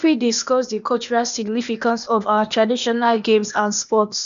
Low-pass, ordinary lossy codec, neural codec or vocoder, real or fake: 7.2 kHz; none; codec, 16 kHz, 0.8 kbps, ZipCodec; fake